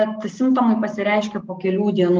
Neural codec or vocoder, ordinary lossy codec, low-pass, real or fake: none; Opus, 32 kbps; 7.2 kHz; real